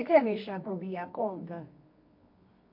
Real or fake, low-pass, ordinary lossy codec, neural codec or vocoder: fake; 5.4 kHz; MP3, 32 kbps; codec, 24 kHz, 0.9 kbps, WavTokenizer, medium music audio release